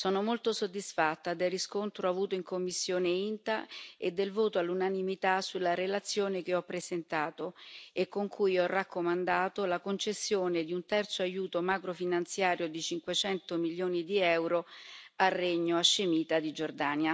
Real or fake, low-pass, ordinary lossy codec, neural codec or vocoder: real; none; none; none